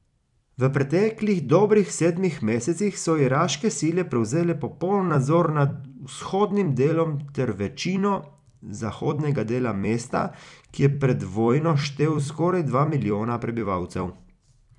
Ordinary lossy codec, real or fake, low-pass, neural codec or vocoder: none; fake; 10.8 kHz; vocoder, 44.1 kHz, 128 mel bands every 256 samples, BigVGAN v2